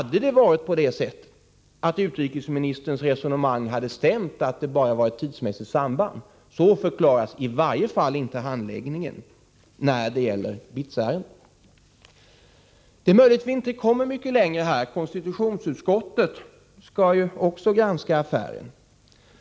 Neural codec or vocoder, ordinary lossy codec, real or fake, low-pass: none; none; real; none